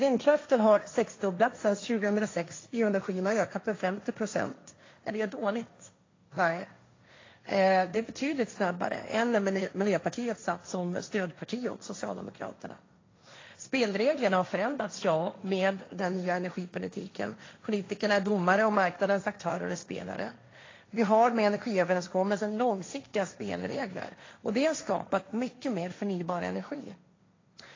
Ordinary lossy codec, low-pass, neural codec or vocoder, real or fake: AAC, 32 kbps; 7.2 kHz; codec, 16 kHz, 1.1 kbps, Voila-Tokenizer; fake